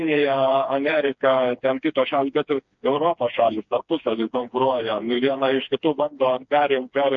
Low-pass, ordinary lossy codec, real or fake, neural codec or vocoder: 7.2 kHz; MP3, 48 kbps; fake; codec, 16 kHz, 2 kbps, FreqCodec, smaller model